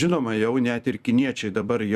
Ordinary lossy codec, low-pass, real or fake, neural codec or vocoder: Opus, 64 kbps; 14.4 kHz; fake; vocoder, 44.1 kHz, 128 mel bands every 256 samples, BigVGAN v2